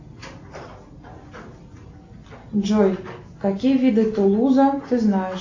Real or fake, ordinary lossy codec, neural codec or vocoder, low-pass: real; AAC, 48 kbps; none; 7.2 kHz